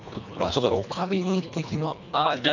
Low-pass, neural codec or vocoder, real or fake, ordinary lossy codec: 7.2 kHz; codec, 24 kHz, 1.5 kbps, HILCodec; fake; none